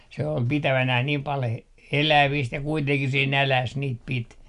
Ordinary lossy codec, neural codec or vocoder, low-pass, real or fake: none; none; 10.8 kHz; real